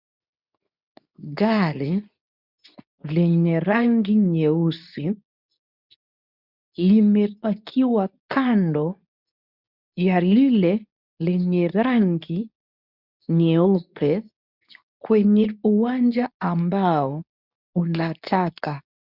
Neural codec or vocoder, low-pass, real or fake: codec, 24 kHz, 0.9 kbps, WavTokenizer, medium speech release version 2; 5.4 kHz; fake